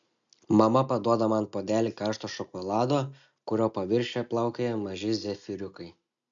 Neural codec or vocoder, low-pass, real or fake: none; 7.2 kHz; real